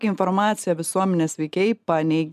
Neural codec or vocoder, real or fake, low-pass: none; real; 14.4 kHz